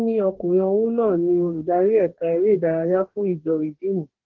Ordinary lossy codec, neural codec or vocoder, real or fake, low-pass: Opus, 16 kbps; codec, 44.1 kHz, 2.6 kbps, DAC; fake; 7.2 kHz